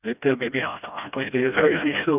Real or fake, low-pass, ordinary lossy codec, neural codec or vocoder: fake; 3.6 kHz; none; codec, 16 kHz, 1 kbps, FreqCodec, smaller model